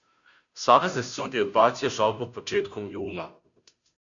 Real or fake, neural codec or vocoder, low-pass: fake; codec, 16 kHz, 0.5 kbps, FunCodec, trained on Chinese and English, 25 frames a second; 7.2 kHz